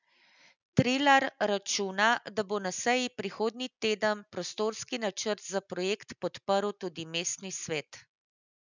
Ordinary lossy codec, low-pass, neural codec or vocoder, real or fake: none; 7.2 kHz; none; real